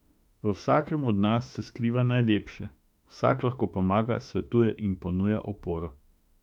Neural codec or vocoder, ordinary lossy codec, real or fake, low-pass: autoencoder, 48 kHz, 32 numbers a frame, DAC-VAE, trained on Japanese speech; none; fake; 19.8 kHz